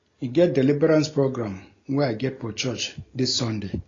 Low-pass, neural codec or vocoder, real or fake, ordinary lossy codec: 7.2 kHz; none; real; AAC, 32 kbps